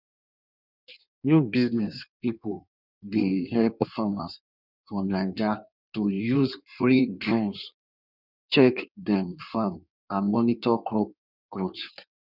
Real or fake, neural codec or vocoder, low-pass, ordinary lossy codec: fake; codec, 16 kHz in and 24 kHz out, 1.1 kbps, FireRedTTS-2 codec; 5.4 kHz; none